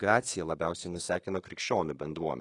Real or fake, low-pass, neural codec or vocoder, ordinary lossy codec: fake; 10.8 kHz; codec, 24 kHz, 1.2 kbps, DualCodec; AAC, 32 kbps